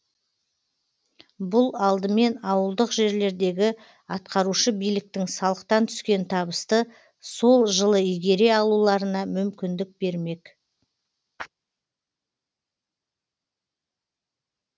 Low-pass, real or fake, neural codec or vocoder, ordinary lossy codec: none; real; none; none